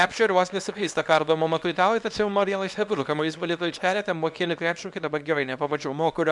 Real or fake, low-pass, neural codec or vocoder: fake; 10.8 kHz; codec, 24 kHz, 0.9 kbps, WavTokenizer, small release